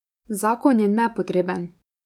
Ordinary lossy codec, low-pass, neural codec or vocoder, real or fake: none; 19.8 kHz; codec, 44.1 kHz, 7.8 kbps, DAC; fake